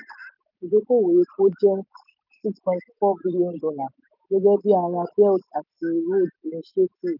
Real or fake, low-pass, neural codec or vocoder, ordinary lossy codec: real; 5.4 kHz; none; none